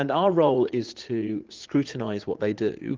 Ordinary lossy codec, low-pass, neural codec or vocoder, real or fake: Opus, 16 kbps; 7.2 kHz; vocoder, 44.1 kHz, 80 mel bands, Vocos; fake